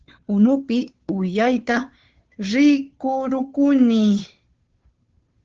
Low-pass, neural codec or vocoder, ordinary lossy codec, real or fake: 7.2 kHz; codec, 16 kHz, 2 kbps, FunCodec, trained on Chinese and English, 25 frames a second; Opus, 16 kbps; fake